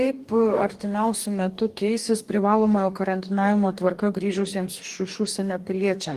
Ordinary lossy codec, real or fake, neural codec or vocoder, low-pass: Opus, 24 kbps; fake; codec, 44.1 kHz, 2.6 kbps, DAC; 14.4 kHz